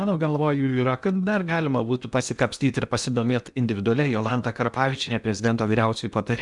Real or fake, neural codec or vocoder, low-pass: fake; codec, 16 kHz in and 24 kHz out, 0.8 kbps, FocalCodec, streaming, 65536 codes; 10.8 kHz